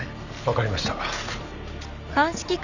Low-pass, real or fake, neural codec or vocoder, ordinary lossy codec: 7.2 kHz; real; none; none